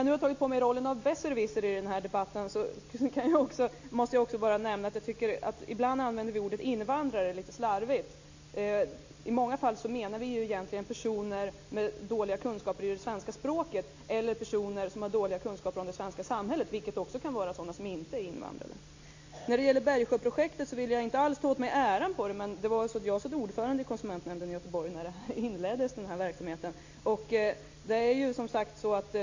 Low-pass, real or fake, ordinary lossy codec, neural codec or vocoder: 7.2 kHz; real; AAC, 48 kbps; none